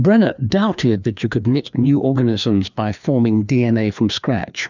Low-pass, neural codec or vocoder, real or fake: 7.2 kHz; codec, 16 kHz, 2 kbps, FreqCodec, larger model; fake